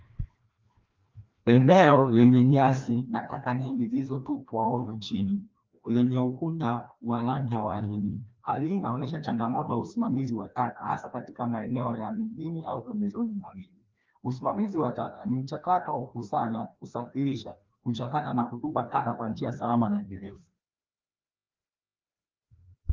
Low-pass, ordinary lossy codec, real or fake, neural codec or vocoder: 7.2 kHz; Opus, 32 kbps; fake; codec, 16 kHz, 1 kbps, FreqCodec, larger model